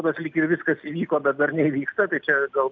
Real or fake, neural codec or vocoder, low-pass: real; none; 7.2 kHz